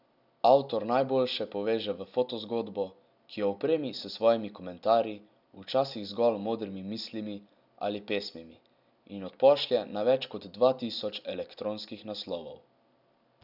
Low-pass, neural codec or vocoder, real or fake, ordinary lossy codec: 5.4 kHz; none; real; none